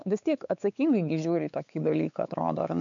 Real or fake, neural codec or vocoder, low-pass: fake; codec, 16 kHz, 4 kbps, X-Codec, WavLM features, trained on Multilingual LibriSpeech; 7.2 kHz